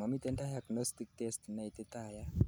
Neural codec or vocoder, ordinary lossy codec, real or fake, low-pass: none; none; real; none